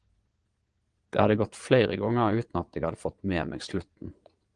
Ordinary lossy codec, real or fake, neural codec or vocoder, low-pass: Opus, 24 kbps; fake; vocoder, 22.05 kHz, 80 mel bands, Vocos; 9.9 kHz